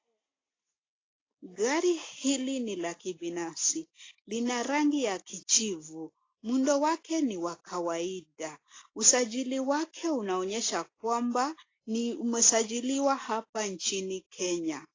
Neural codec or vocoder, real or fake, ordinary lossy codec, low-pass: none; real; AAC, 32 kbps; 7.2 kHz